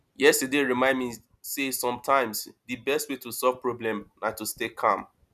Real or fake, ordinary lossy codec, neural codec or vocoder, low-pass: real; none; none; 14.4 kHz